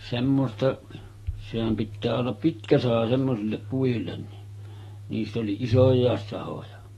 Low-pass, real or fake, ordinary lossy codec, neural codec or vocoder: 19.8 kHz; fake; AAC, 32 kbps; autoencoder, 48 kHz, 128 numbers a frame, DAC-VAE, trained on Japanese speech